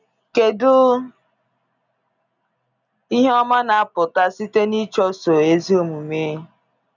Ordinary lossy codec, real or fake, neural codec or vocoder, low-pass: none; real; none; 7.2 kHz